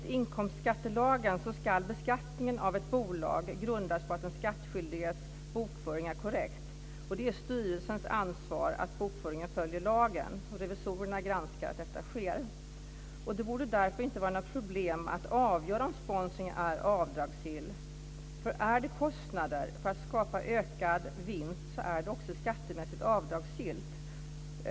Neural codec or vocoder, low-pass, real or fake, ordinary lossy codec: none; none; real; none